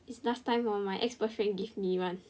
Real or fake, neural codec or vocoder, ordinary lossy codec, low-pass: real; none; none; none